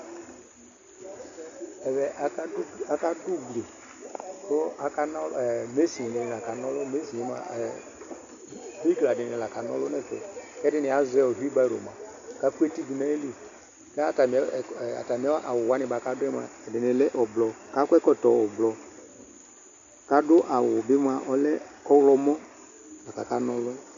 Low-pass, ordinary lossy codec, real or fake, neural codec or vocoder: 7.2 kHz; AAC, 64 kbps; real; none